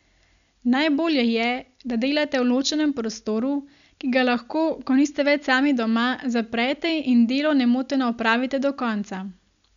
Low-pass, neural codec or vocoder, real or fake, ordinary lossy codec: 7.2 kHz; none; real; none